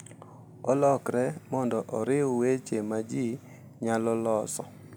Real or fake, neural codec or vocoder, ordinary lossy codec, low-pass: real; none; none; none